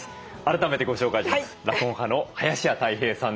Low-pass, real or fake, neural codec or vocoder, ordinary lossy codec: none; real; none; none